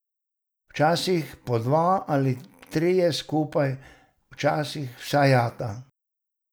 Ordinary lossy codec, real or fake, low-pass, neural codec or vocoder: none; real; none; none